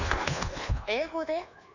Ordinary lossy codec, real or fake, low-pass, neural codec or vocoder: none; fake; 7.2 kHz; codec, 24 kHz, 1.2 kbps, DualCodec